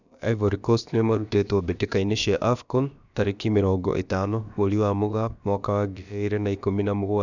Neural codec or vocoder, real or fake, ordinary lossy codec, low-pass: codec, 16 kHz, about 1 kbps, DyCAST, with the encoder's durations; fake; none; 7.2 kHz